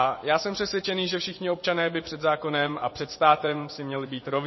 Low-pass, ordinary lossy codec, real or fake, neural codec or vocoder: 7.2 kHz; MP3, 24 kbps; fake; vocoder, 24 kHz, 100 mel bands, Vocos